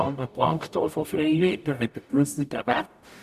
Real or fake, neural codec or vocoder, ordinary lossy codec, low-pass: fake; codec, 44.1 kHz, 0.9 kbps, DAC; none; 14.4 kHz